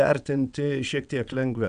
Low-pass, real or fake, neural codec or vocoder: 9.9 kHz; fake; vocoder, 22.05 kHz, 80 mel bands, WaveNeXt